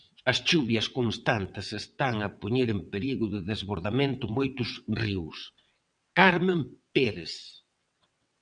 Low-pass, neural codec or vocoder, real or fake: 9.9 kHz; vocoder, 22.05 kHz, 80 mel bands, WaveNeXt; fake